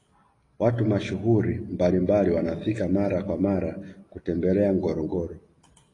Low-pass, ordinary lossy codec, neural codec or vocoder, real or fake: 10.8 kHz; AAC, 32 kbps; none; real